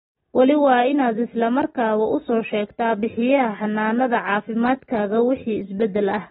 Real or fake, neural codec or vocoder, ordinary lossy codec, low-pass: real; none; AAC, 16 kbps; 10.8 kHz